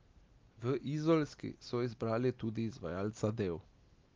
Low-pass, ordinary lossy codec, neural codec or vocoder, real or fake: 7.2 kHz; Opus, 24 kbps; none; real